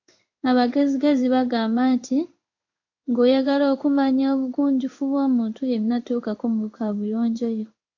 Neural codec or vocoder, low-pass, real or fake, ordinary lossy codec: codec, 16 kHz in and 24 kHz out, 1 kbps, XY-Tokenizer; 7.2 kHz; fake; AAC, 48 kbps